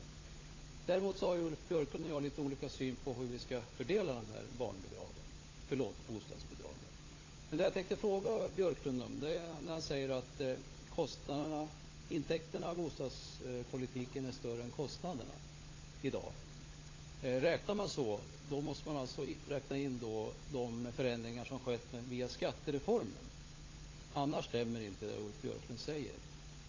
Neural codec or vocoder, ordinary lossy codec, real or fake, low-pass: codec, 16 kHz, 16 kbps, FunCodec, trained on LibriTTS, 50 frames a second; AAC, 32 kbps; fake; 7.2 kHz